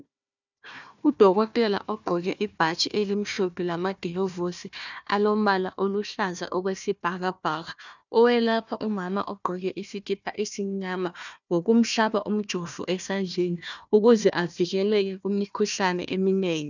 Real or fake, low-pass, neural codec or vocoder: fake; 7.2 kHz; codec, 16 kHz, 1 kbps, FunCodec, trained on Chinese and English, 50 frames a second